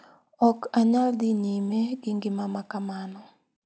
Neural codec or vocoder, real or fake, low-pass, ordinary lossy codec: none; real; none; none